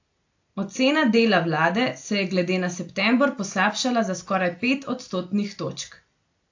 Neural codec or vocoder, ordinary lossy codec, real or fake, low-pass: none; AAC, 48 kbps; real; 7.2 kHz